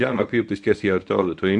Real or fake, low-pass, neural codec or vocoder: fake; 10.8 kHz; codec, 24 kHz, 0.9 kbps, WavTokenizer, medium speech release version 1